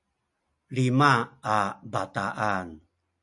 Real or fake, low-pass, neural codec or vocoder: real; 10.8 kHz; none